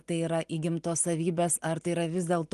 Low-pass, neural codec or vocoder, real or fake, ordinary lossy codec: 10.8 kHz; none; real; Opus, 24 kbps